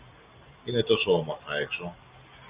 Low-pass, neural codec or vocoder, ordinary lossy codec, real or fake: 3.6 kHz; none; Opus, 64 kbps; real